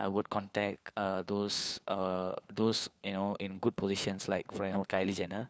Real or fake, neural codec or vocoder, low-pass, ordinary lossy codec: fake; codec, 16 kHz, 4 kbps, FunCodec, trained on LibriTTS, 50 frames a second; none; none